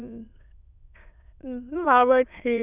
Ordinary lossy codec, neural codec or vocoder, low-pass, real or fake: none; autoencoder, 22.05 kHz, a latent of 192 numbers a frame, VITS, trained on many speakers; 3.6 kHz; fake